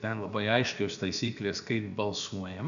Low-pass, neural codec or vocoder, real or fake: 7.2 kHz; codec, 16 kHz, about 1 kbps, DyCAST, with the encoder's durations; fake